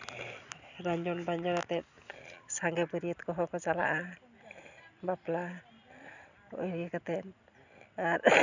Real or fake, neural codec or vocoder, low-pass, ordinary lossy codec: real; none; 7.2 kHz; none